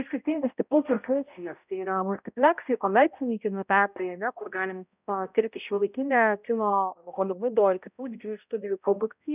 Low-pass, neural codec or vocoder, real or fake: 3.6 kHz; codec, 16 kHz, 0.5 kbps, X-Codec, HuBERT features, trained on balanced general audio; fake